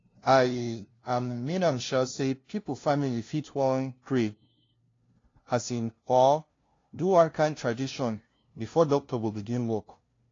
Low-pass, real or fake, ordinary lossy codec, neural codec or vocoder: 7.2 kHz; fake; AAC, 32 kbps; codec, 16 kHz, 0.5 kbps, FunCodec, trained on LibriTTS, 25 frames a second